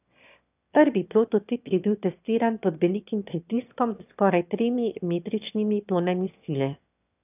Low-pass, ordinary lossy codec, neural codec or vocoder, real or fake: 3.6 kHz; none; autoencoder, 22.05 kHz, a latent of 192 numbers a frame, VITS, trained on one speaker; fake